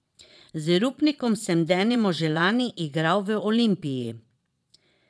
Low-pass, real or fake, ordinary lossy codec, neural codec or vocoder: none; fake; none; vocoder, 22.05 kHz, 80 mel bands, Vocos